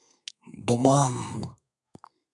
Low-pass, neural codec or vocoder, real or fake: 10.8 kHz; codec, 32 kHz, 1.9 kbps, SNAC; fake